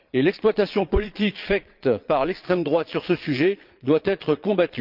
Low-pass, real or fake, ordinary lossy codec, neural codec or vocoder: 5.4 kHz; fake; Opus, 32 kbps; vocoder, 22.05 kHz, 80 mel bands, Vocos